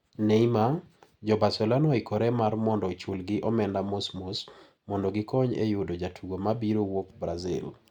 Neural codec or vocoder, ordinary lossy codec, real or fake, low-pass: none; Opus, 64 kbps; real; 19.8 kHz